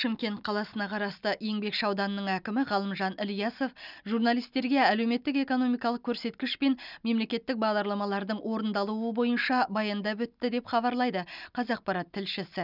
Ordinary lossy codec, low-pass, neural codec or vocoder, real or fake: none; 5.4 kHz; none; real